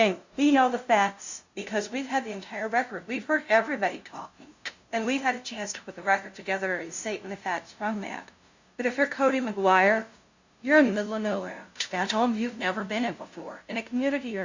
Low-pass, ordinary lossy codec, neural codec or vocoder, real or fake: 7.2 kHz; Opus, 64 kbps; codec, 16 kHz, 0.5 kbps, FunCodec, trained on LibriTTS, 25 frames a second; fake